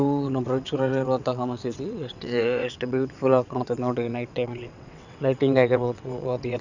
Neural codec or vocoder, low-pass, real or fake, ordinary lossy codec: vocoder, 22.05 kHz, 80 mel bands, WaveNeXt; 7.2 kHz; fake; none